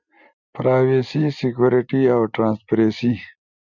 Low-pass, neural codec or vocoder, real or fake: 7.2 kHz; none; real